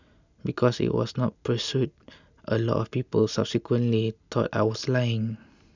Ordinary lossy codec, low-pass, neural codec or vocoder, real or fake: none; 7.2 kHz; none; real